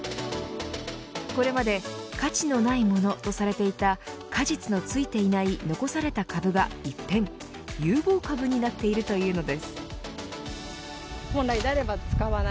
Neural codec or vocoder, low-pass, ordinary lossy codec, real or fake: none; none; none; real